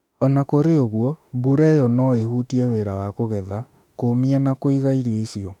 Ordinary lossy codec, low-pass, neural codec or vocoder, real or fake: none; 19.8 kHz; autoencoder, 48 kHz, 32 numbers a frame, DAC-VAE, trained on Japanese speech; fake